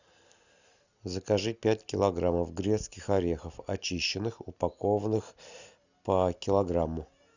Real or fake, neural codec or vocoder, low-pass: real; none; 7.2 kHz